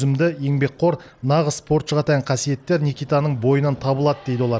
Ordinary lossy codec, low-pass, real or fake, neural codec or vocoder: none; none; real; none